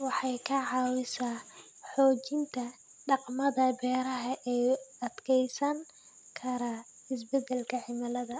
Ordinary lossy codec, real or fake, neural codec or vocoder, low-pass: none; real; none; none